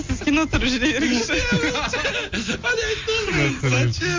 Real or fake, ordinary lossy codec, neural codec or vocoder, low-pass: real; MP3, 48 kbps; none; 7.2 kHz